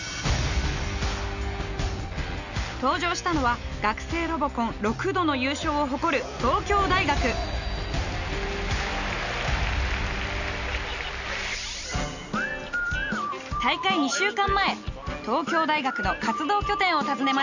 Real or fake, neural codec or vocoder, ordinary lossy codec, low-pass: real; none; none; 7.2 kHz